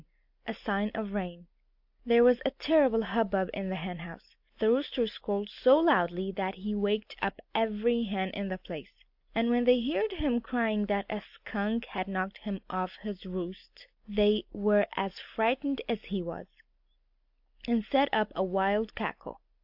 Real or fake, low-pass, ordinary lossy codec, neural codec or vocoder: real; 5.4 kHz; AAC, 48 kbps; none